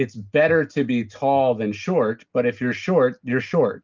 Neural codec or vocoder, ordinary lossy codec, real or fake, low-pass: none; Opus, 24 kbps; real; 7.2 kHz